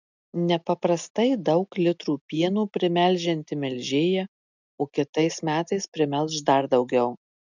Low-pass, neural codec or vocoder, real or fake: 7.2 kHz; none; real